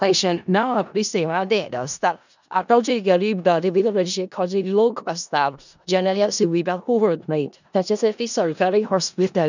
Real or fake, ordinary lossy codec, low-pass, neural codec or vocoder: fake; none; 7.2 kHz; codec, 16 kHz in and 24 kHz out, 0.4 kbps, LongCat-Audio-Codec, four codebook decoder